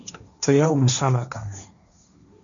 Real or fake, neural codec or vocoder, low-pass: fake; codec, 16 kHz, 1.1 kbps, Voila-Tokenizer; 7.2 kHz